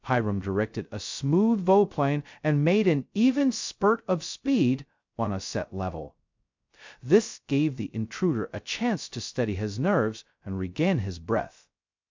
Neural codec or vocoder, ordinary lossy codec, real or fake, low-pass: codec, 16 kHz, 0.2 kbps, FocalCodec; MP3, 64 kbps; fake; 7.2 kHz